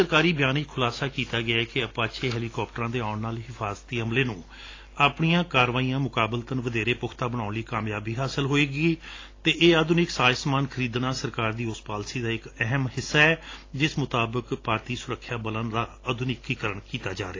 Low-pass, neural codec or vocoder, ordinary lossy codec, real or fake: 7.2 kHz; none; AAC, 32 kbps; real